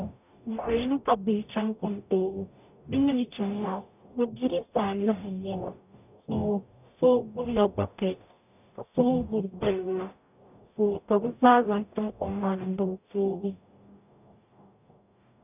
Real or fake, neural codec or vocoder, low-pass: fake; codec, 44.1 kHz, 0.9 kbps, DAC; 3.6 kHz